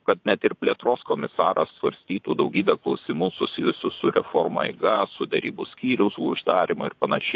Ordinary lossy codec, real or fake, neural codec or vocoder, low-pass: AAC, 48 kbps; fake; vocoder, 22.05 kHz, 80 mel bands, WaveNeXt; 7.2 kHz